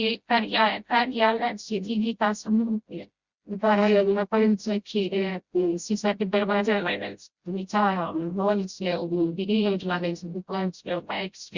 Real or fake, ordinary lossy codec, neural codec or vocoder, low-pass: fake; Opus, 64 kbps; codec, 16 kHz, 0.5 kbps, FreqCodec, smaller model; 7.2 kHz